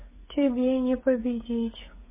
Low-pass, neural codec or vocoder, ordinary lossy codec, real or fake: 3.6 kHz; codec, 16 kHz, 8 kbps, FreqCodec, smaller model; MP3, 16 kbps; fake